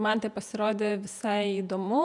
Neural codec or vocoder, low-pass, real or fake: vocoder, 48 kHz, 128 mel bands, Vocos; 10.8 kHz; fake